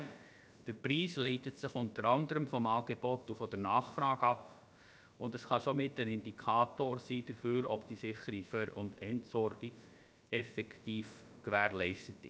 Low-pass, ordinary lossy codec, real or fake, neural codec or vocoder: none; none; fake; codec, 16 kHz, about 1 kbps, DyCAST, with the encoder's durations